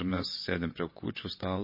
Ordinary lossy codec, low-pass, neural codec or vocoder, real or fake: MP3, 24 kbps; 5.4 kHz; vocoder, 22.05 kHz, 80 mel bands, WaveNeXt; fake